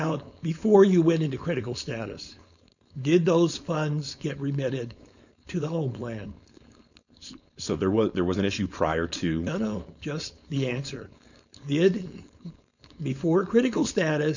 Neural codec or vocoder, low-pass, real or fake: codec, 16 kHz, 4.8 kbps, FACodec; 7.2 kHz; fake